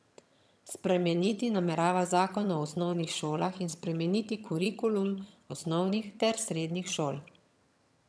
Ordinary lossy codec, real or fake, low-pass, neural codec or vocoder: none; fake; none; vocoder, 22.05 kHz, 80 mel bands, HiFi-GAN